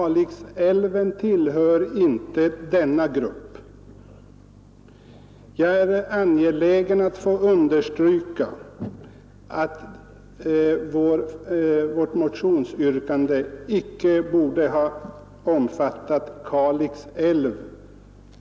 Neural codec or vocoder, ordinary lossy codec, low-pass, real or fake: none; none; none; real